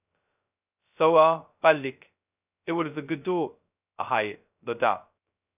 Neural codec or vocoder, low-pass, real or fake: codec, 16 kHz, 0.2 kbps, FocalCodec; 3.6 kHz; fake